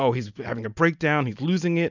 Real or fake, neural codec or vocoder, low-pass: real; none; 7.2 kHz